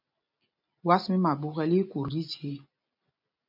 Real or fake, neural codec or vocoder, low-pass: real; none; 5.4 kHz